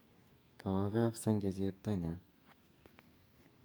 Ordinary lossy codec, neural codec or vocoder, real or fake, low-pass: none; codec, 44.1 kHz, 2.6 kbps, SNAC; fake; none